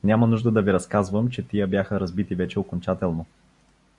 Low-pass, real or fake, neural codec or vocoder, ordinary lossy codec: 10.8 kHz; real; none; MP3, 64 kbps